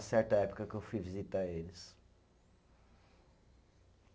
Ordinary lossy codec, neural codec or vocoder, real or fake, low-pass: none; none; real; none